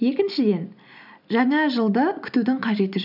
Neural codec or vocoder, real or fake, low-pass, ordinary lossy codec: none; real; 5.4 kHz; none